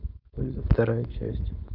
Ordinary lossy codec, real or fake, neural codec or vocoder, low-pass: none; fake; codec, 16 kHz, 4.8 kbps, FACodec; 5.4 kHz